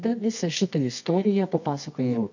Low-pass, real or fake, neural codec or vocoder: 7.2 kHz; fake; codec, 24 kHz, 0.9 kbps, WavTokenizer, medium music audio release